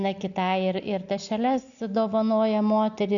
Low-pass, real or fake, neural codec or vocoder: 7.2 kHz; real; none